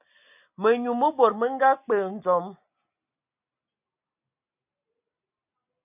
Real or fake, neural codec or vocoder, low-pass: real; none; 3.6 kHz